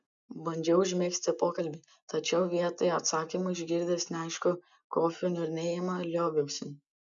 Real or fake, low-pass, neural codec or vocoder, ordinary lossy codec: real; 7.2 kHz; none; MP3, 96 kbps